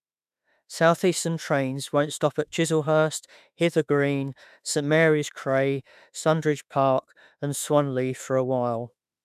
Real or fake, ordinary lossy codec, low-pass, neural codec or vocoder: fake; none; 14.4 kHz; autoencoder, 48 kHz, 32 numbers a frame, DAC-VAE, trained on Japanese speech